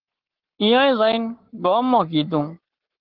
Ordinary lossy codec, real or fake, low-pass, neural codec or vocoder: Opus, 16 kbps; fake; 5.4 kHz; codec, 44.1 kHz, 7.8 kbps, Pupu-Codec